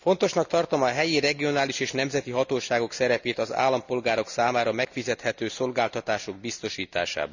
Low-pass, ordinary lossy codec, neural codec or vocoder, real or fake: 7.2 kHz; none; none; real